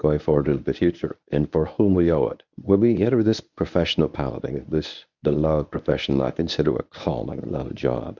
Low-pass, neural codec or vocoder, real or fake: 7.2 kHz; codec, 24 kHz, 0.9 kbps, WavTokenizer, medium speech release version 1; fake